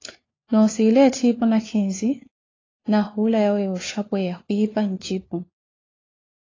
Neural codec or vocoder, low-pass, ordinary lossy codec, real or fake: codec, 16 kHz, 4 kbps, FunCodec, trained on LibriTTS, 50 frames a second; 7.2 kHz; AAC, 32 kbps; fake